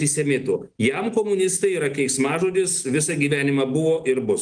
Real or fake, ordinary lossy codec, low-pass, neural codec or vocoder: real; Opus, 24 kbps; 9.9 kHz; none